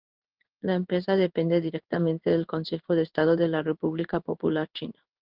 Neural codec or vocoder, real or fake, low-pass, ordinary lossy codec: codec, 16 kHz in and 24 kHz out, 1 kbps, XY-Tokenizer; fake; 5.4 kHz; Opus, 32 kbps